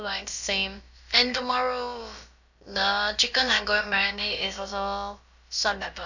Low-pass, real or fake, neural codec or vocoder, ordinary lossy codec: 7.2 kHz; fake; codec, 16 kHz, about 1 kbps, DyCAST, with the encoder's durations; none